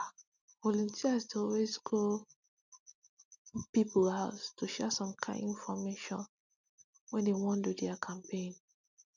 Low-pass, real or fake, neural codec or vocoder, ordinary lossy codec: 7.2 kHz; real; none; none